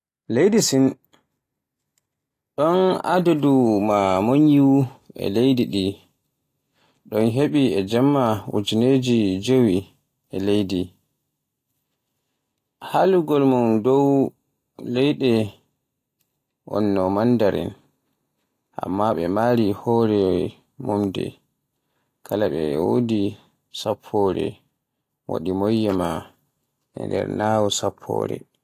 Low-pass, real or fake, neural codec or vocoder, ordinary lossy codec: 14.4 kHz; real; none; AAC, 48 kbps